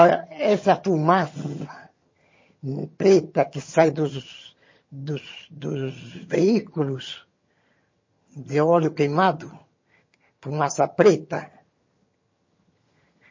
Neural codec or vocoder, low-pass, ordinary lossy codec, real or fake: vocoder, 22.05 kHz, 80 mel bands, HiFi-GAN; 7.2 kHz; MP3, 32 kbps; fake